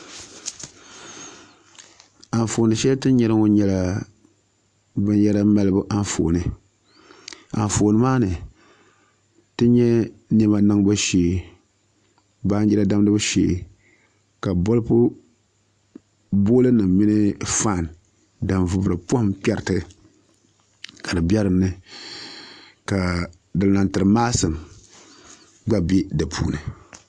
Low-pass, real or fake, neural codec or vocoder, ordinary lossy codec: 9.9 kHz; real; none; AAC, 64 kbps